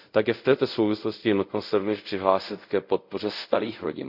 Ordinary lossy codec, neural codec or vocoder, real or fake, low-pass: none; codec, 24 kHz, 0.5 kbps, DualCodec; fake; 5.4 kHz